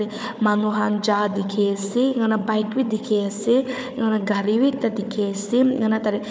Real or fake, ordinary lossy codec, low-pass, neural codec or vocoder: fake; none; none; codec, 16 kHz, 4 kbps, FunCodec, trained on Chinese and English, 50 frames a second